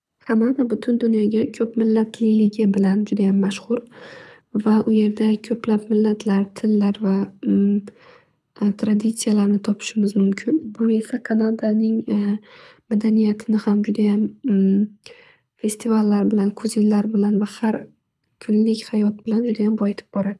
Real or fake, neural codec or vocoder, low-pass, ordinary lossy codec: fake; codec, 24 kHz, 6 kbps, HILCodec; none; none